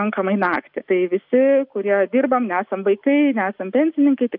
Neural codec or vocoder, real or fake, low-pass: none; real; 5.4 kHz